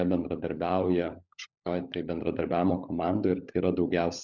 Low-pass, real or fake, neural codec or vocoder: 7.2 kHz; fake; codec, 16 kHz, 16 kbps, FunCodec, trained on LibriTTS, 50 frames a second